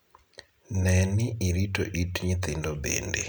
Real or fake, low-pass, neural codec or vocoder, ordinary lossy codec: real; none; none; none